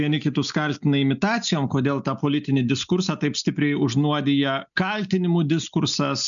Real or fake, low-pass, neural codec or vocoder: real; 7.2 kHz; none